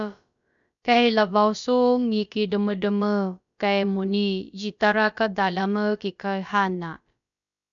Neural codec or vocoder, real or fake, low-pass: codec, 16 kHz, about 1 kbps, DyCAST, with the encoder's durations; fake; 7.2 kHz